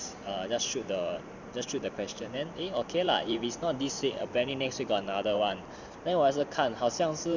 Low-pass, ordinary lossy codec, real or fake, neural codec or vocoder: 7.2 kHz; none; fake; vocoder, 44.1 kHz, 128 mel bands every 512 samples, BigVGAN v2